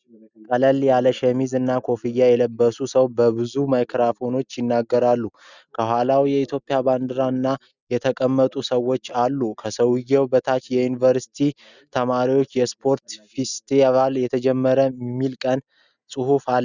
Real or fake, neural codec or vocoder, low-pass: real; none; 7.2 kHz